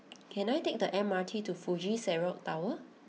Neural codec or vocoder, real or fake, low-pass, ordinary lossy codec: none; real; none; none